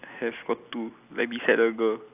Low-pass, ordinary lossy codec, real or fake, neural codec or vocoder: 3.6 kHz; AAC, 32 kbps; real; none